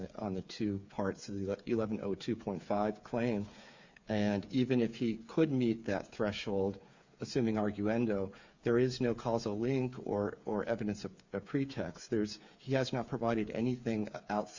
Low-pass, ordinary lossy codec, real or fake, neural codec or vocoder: 7.2 kHz; Opus, 64 kbps; fake; codec, 16 kHz, 8 kbps, FreqCodec, smaller model